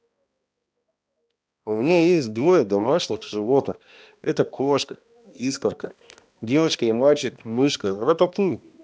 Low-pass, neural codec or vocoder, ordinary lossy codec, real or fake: none; codec, 16 kHz, 1 kbps, X-Codec, HuBERT features, trained on balanced general audio; none; fake